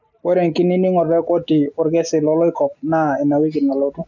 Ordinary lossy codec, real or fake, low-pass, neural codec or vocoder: none; real; 7.2 kHz; none